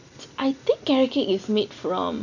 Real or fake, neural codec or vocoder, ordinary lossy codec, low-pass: real; none; none; 7.2 kHz